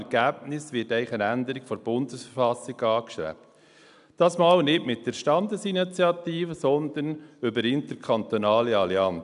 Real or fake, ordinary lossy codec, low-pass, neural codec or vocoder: real; AAC, 96 kbps; 10.8 kHz; none